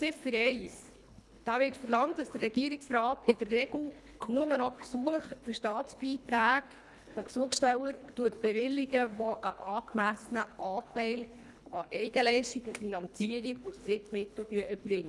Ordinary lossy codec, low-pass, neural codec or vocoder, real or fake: none; none; codec, 24 kHz, 1.5 kbps, HILCodec; fake